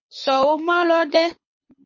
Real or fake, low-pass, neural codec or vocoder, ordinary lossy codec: fake; 7.2 kHz; codec, 24 kHz, 6 kbps, HILCodec; MP3, 32 kbps